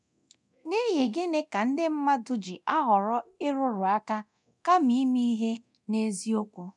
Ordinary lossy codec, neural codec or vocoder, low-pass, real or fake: none; codec, 24 kHz, 0.9 kbps, DualCodec; 10.8 kHz; fake